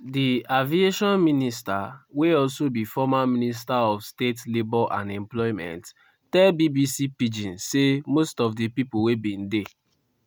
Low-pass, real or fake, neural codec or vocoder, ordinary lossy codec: none; real; none; none